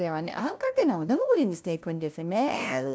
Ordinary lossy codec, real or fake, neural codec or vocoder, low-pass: none; fake; codec, 16 kHz, 0.5 kbps, FunCodec, trained on LibriTTS, 25 frames a second; none